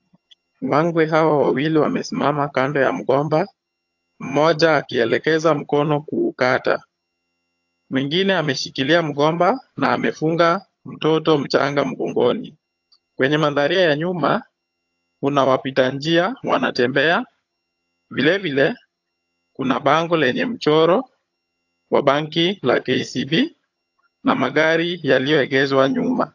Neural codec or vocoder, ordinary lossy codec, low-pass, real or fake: vocoder, 22.05 kHz, 80 mel bands, HiFi-GAN; AAC, 48 kbps; 7.2 kHz; fake